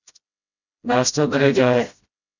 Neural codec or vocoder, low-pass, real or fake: codec, 16 kHz, 0.5 kbps, FreqCodec, smaller model; 7.2 kHz; fake